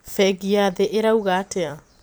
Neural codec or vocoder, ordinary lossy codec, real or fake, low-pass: vocoder, 44.1 kHz, 128 mel bands every 256 samples, BigVGAN v2; none; fake; none